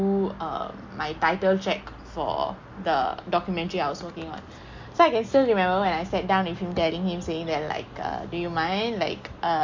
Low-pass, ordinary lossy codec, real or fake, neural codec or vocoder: 7.2 kHz; MP3, 48 kbps; real; none